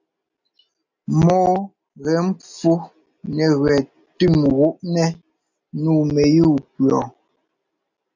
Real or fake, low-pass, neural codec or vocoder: real; 7.2 kHz; none